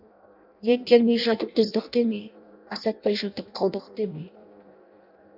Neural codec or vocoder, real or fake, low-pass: codec, 16 kHz in and 24 kHz out, 0.6 kbps, FireRedTTS-2 codec; fake; 5.4 kHz